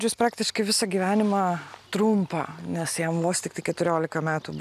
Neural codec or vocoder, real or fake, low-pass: none; real; 14.4 kHz